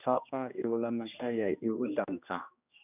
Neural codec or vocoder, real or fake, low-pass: codec, 16 kHz, 1 kbps, X-Codec, HuBERT features, trained on general audio; fake; 3.6 kHz